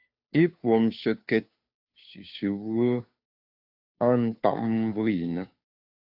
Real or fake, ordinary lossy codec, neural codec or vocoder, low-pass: fake; AAC, 48 kbps; codec, 16 kHz, 2 kbps, FunCodec, trained on Chinese and English, 25 frames a second; 5.4 kHz